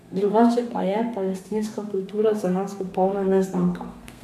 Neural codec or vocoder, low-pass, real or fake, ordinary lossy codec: codec, 32 kHz, 1.9 kbps, SNAC; 14.4 kHz; fake; none